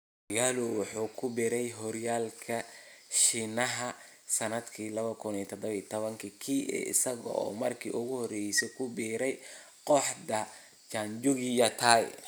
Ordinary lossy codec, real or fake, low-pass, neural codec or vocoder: none; real; none; none